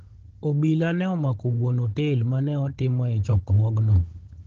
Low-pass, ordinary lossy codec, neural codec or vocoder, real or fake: 7.2 kHz; Opus, 16 kbps; codec, 16 kHz, 16 kbps, FunCodec, trained on Chinese and English, 50 frames a second; fake